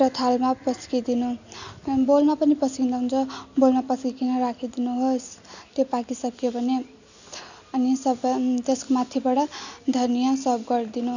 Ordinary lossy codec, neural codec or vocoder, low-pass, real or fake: none; none; 7.2 kHz; real